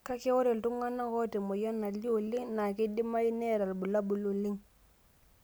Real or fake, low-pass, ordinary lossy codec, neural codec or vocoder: real; none; none; none